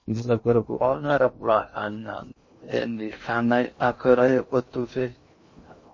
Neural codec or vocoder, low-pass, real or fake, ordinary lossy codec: codec, 16 kHz in and 24 kHz out, 0.6 kbps, FocalCodec, streaming, 2048 codes; 7.2 kHz; fake; MP3, 32 kbps